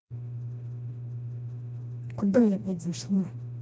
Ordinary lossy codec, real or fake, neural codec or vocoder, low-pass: none; fake; codec, 16 kHz, 1 kbps, FreqCodec, smaller model; none